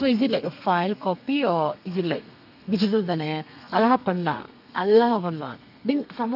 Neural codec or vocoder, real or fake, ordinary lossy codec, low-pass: codec, 32 kHz, 1.9 kbps, SNAC; fake; none; 5.4 kHz